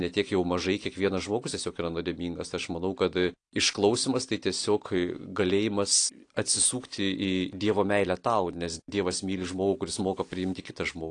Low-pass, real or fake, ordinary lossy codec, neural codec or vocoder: 9.9 kHz; real; AAC, 48 kbps; none